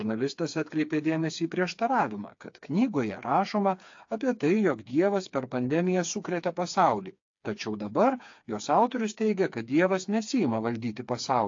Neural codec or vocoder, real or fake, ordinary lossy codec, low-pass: codec, 16 kHz, 4 kbps, FreqCodec, smaller model; fake; AAC, 48 kbps; 7.2 kHz